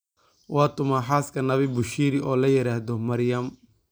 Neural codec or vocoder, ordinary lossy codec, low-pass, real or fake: none; none; none; real